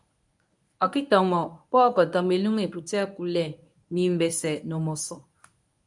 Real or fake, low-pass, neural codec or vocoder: fake; 10.8 kHz; codec, 24 kHz, 0.9 kbps, WavTokenizer, medium speech release version 1